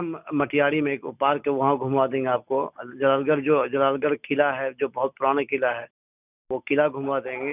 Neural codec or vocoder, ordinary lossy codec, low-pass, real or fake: none; none; 3.6 kHz; real